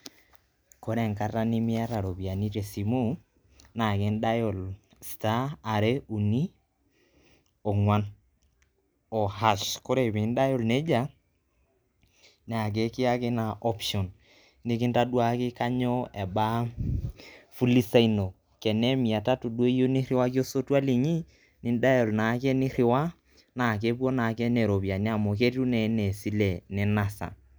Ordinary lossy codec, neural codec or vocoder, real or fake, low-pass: none; none; real; none